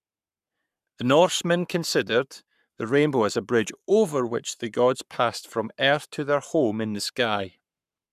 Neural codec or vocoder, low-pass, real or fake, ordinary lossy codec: codec, 44.1 kHz, 7.8 kbps, Pupu-Codec; 14.4 kHz; fake; none